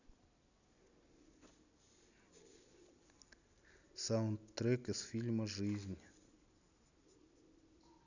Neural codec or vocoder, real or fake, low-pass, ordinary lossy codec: none; real; 7.2 kHz; none